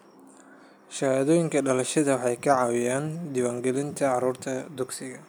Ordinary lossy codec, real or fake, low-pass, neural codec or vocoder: none; real; none; none